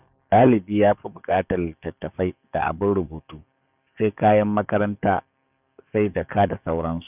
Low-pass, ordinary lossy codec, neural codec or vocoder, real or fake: 3.6 kHz; none; codec, 44.1 kHz, 7.8 kbps, DAC; fake